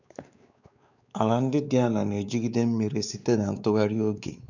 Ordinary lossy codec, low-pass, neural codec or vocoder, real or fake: none; 7.2 kHz; codec, 16 kHz, 4 kbps, X-Codec, WavLM features, trained on Multilingual LibriSpeech; fake